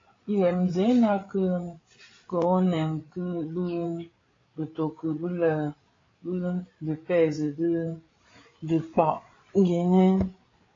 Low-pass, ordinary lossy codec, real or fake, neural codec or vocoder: 7.2 kHz; AAC, 32 kbps; fake; codec, 16 kHz, 8 kbps, FreqCodec, larger model